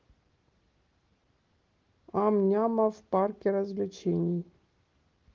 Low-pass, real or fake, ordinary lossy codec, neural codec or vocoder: 7.2 kHz; real; Opus, 16 kbps; none